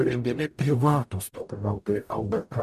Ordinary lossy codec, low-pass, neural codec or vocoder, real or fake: MP3, 96 kbps; 14.4 kHz; codec, 44.1 kHz, 0.9 kbps, DAC; fake